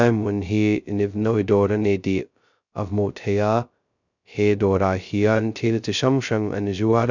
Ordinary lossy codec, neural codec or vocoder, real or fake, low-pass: none; codec, 16 kHz, 0.2 kbps, FocalCodec; fake; 7.2 kHz